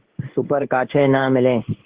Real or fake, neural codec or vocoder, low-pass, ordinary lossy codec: fake; codec, 16 kHz in and 24 kHz out, 1 kbps, XY-Tokenizer; 3.6 kHz; Opus, 16 kbps